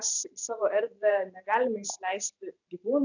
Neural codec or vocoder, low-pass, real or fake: vocoder, 44.1 kHz, 128 mel bands every 512 samples, BigVGAN v2; 7.2 kHz; fake